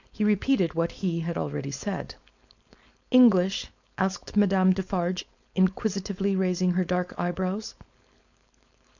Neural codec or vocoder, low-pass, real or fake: codec, 16 kHz, 4.8 kbps, FACodec; 7.2 kHz; fake